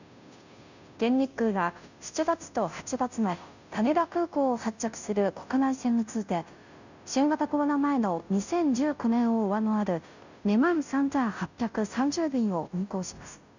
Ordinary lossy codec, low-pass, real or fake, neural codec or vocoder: none; 7.2 kHz; fake; codec, 16 kHz, 0.5 kbps, FunCodec, trained on Chinese and English, 25 frames a second